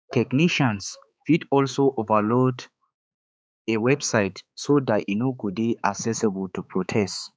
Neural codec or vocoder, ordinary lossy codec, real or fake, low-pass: codec, 16 kHz, 4 kbps, X-Codec, HuBERT features, trained on balanced general audio; none; fake; none